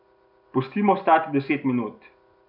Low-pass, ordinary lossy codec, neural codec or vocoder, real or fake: 5.4 kHz; none; none; real